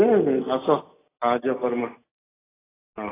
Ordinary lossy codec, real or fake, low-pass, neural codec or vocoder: AAC, 16 kbps; real; 3.6 kHz; none